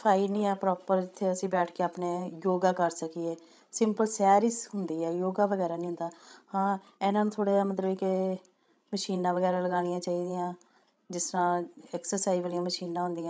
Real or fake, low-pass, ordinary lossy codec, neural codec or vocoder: fake; none; none; codec, 16 kHz, 16 kbps, FreqCodec, larger model